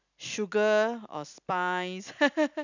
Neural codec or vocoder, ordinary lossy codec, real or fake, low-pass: none; none; real; 7.2 kHz